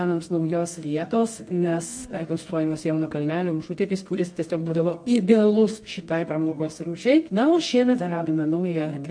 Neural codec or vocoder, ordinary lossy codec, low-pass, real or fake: codec, 24 kHz, 0.9 kbps, WavTokenizer, medium music audio release; MP3, 48 kbps; 9.9 kHz; fake